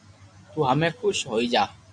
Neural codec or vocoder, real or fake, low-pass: none; real; 9.9 kHz